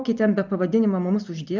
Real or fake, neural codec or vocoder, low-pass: real; none; 7.2 kHz